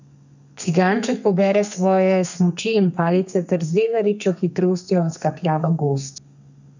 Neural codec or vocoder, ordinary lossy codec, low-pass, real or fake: codec, 32 kHz, 1.9 kbps, SNAC; none; 7.2 kHz; fake